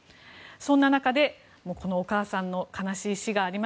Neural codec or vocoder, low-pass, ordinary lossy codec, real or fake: none; none; none; real